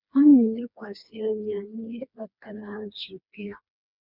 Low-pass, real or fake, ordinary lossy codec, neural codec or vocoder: 5.4 kHz; fake; none; codec, 16 kHz, 2 kbps, FreqCodec, smaller model